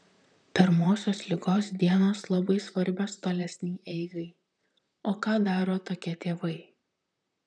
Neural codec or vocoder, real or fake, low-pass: vocoder, 44.1 kHz, 128 mel bands, Pupu-Vocoder; fake; 9.9 kHz